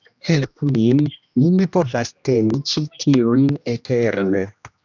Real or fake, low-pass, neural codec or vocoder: fake; 7.2 kHz; codec, 16 kHz, 1 kbps, X-Codec, HuBERT features, trained on general audio